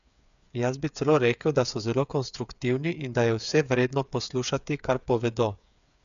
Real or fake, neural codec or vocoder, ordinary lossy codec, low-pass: fake; codec, 16 kHz, 8 kbps, FreqCodec, smaller model; MP3, 96 kbps; 7.2 kHz